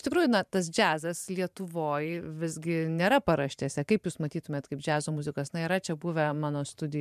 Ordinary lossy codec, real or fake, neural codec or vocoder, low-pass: MP3, 96 kbps; fake; vocoder, 44.1 kHz, 128 mel bands every 512 samples, BigVGAN v2; 14.4 kHz